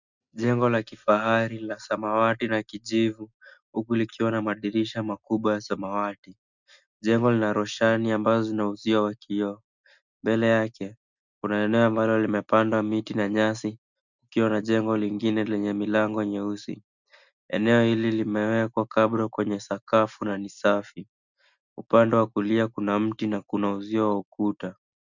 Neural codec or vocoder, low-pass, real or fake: none; 7.2 kHz; real